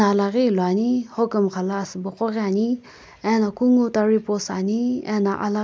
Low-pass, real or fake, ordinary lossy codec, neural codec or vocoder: none; real; none; none